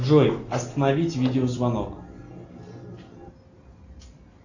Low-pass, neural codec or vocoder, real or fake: 7.2 kHz; none; real